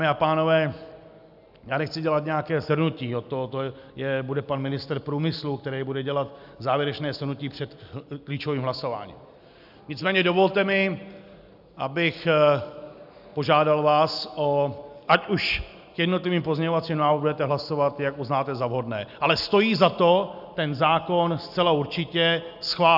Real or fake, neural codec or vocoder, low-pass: real; none; 5.4 kHz